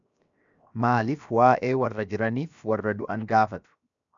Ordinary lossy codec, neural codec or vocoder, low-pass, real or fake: MP3, 96 kbps; codec, 16 kHz, 0.7 kbps, FocalCodec; 7.2 kHz; fake